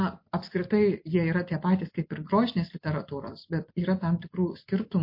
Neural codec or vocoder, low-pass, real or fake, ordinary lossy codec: none; 5.4 kHz; real; MP3, 32 kbps